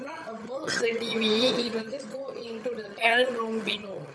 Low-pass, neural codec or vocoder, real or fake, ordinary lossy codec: none; vocoder, 22.05 kHz, 80 mel bands, HiFi-GAN; fake; none